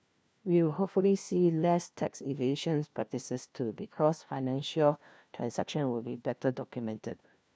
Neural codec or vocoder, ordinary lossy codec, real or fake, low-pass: codec, 16 kHz, 1 kbps, FunCodec, trained on LibriTTS, 50 frames a second; none; fake; none